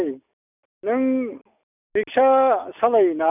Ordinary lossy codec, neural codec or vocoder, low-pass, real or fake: none; none; 3.6 kHz; real